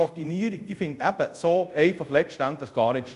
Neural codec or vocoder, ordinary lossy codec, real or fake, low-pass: codec, 24 kHz, 0.5 kbps, DualCodec; none; fake; 10.8 kHz